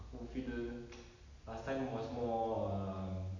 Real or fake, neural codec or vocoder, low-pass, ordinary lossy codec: real; none; 7.2 kHz; none